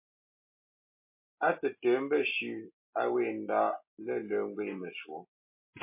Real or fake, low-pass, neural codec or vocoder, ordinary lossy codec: real; 3.6 kHz; none; MP3, 24 kbps